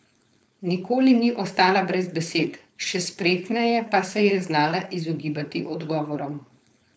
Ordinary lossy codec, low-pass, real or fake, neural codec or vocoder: none; none; fake; codec, 16 kHz, 4.8 kbps, FACodec